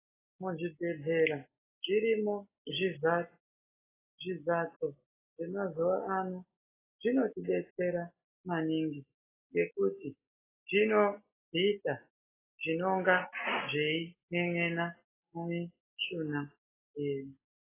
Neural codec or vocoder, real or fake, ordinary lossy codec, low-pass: none; real; AAC, 16 kbps; 3.6 kHz